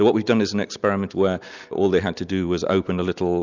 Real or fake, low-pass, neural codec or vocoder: real; 7.2 kHz; none